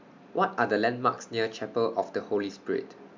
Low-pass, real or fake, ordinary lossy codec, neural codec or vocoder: 7.2 kHz; real; MP3, 64 kbps; none